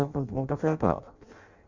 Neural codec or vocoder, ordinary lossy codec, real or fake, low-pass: codec, 16 kHz in and 24 kHz out, 0.6 kbps, FireRedTTS-2 codec; Opus, 64 kbps; fake; 7.2 kHz